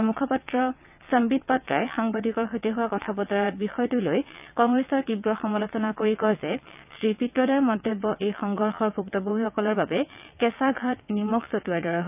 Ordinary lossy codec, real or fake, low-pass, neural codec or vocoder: none; fake; 3.6 kHz; vocoder, 22.05 kHz, 80 mel bands, WaveNeXt